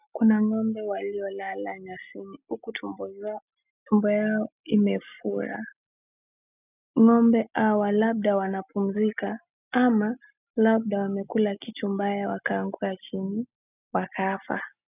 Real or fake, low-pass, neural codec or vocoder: real; 3.6 kHz; none